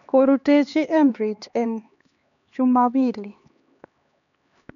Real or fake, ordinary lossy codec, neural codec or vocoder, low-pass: fake; none; codec, 16 kHz, 2 kbps, X-Codec, HuBERT features, trained on LibriSpeech; 7.2 kHz